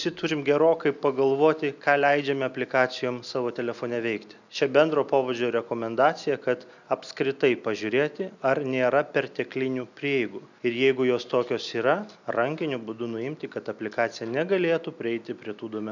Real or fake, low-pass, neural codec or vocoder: real; 7.2 kHz; none